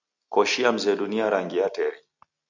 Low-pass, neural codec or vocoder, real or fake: 7.2 kHz; none; real